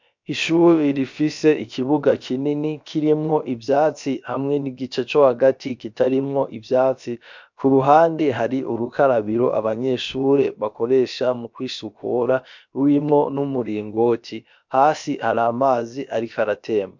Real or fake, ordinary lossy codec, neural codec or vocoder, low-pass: fake; MP3, 64 kbps; codec, 16 kHz, about 1 kbps, DyCAST, with the encoder's durations; 7.2 kHz